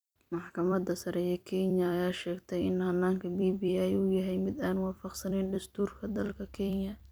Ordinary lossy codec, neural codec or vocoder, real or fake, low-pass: none; vocoder, 44.1 kHz, 128 mel bands every 256 samples, BigVGAN v2; fake; none